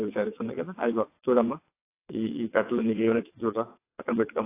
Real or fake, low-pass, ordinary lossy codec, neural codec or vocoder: fake; 3.6 kHz; AAC, 24 kbps; vocoder, 22.05 kHz, 80 mel bands, WaveNeXt